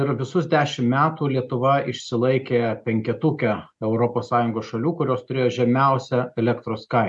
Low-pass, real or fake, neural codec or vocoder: 10.8 kHz; real; none